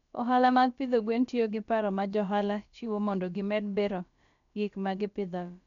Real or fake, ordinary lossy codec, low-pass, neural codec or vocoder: fake; none; 7.2 kHz; codec, 16 kHz, about 1 kbps, DyCAST, with the encoder's durations